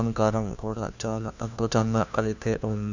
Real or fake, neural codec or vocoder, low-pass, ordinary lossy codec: fake; codec, 16 kHz, 1 kbps, FunCodec, trained on LibriTTS, 50 frames a second; 7.2 kHz; none